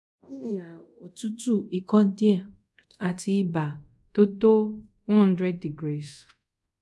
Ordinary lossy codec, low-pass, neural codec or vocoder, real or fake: none; none; codec, 24 kHz, 0.5 kbps, DualCodec; fake